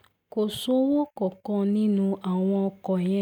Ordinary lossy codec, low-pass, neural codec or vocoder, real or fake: none; 19.8 kHz; none; real